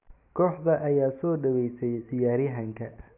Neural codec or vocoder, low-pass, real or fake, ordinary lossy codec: none; 3.6 kHz; real; AAC, 32 kbps